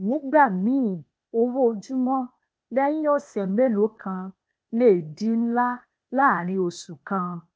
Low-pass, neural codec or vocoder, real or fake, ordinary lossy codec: none; codec, 16 kHz, 0.8 kbps, ZipCodec; fake; none